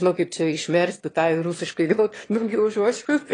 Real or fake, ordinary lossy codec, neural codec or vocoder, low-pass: fake; AAC, 32 kbps; autoencoder, 22.05 kHz, a latent of 192 numbers a frame, VITS, trained on one speaker; 9.9 kHz